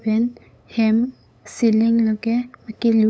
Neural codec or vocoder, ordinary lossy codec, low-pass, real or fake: codec, 16 kHz, 8 kbps, FunCodec, trained on LibriTTS, 25 frames a second; none; none; fake